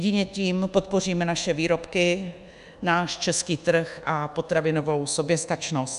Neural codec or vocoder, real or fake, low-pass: codec, 24 kHz, 1.2 kbps, DualCodec; fake; 10.8 kHz